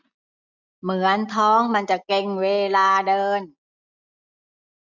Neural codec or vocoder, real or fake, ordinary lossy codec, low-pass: none; real; none; 7.2 kHz